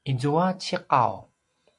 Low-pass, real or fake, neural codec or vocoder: 10.8 kHz; real; none